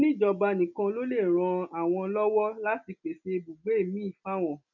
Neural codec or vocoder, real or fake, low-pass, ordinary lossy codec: none; real; 7.2 kHz; none